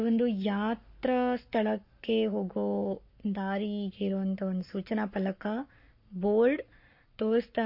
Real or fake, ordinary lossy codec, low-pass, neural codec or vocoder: fake; MP3, 32 kbps; 5.4 kHz; codec, 44.1 kHz, 7.8 kbps, Pupu-Codec